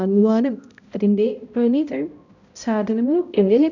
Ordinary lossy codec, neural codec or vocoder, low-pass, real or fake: none; codec, 16 kHz, 0.5 kbps, X-Codec, HuBERT features, trained on balanced general audio; 7.2 kHz; fake